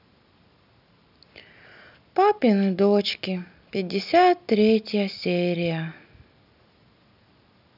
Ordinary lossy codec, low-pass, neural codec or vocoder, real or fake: none; 5.4 kHz; none; real